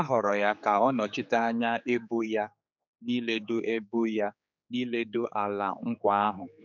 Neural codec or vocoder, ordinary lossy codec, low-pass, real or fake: codec, 16 kHz, 4 kbps, X-Codec, HuBERT features, trained on general audio; none; 7.2 kHz; fake